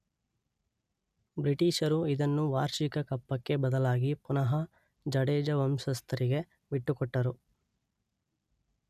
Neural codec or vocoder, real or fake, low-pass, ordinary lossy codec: none; real; 14.4 kHz; none